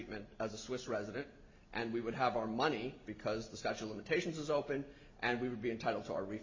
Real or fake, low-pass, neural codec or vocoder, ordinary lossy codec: real; 7.2 kHz; none; MP3, 32 kbps